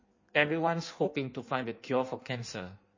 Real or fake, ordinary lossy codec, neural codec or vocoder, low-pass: fake; MP3, 32 kbps; codec, 16 kHz in and 24 kHz out, 1.1 kbps, FireRedTTS-2 codec; 7.2 kHz